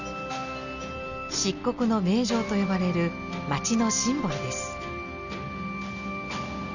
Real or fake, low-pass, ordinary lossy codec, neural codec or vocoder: real; 7.2 kHz; none; none